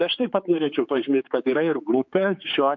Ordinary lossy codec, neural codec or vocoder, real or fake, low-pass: MP3, 48 kbps; codec, 16 kHz, 4 kbps, X-Codec, HuBERT features, trained on general audio; fake; 7.2 kHz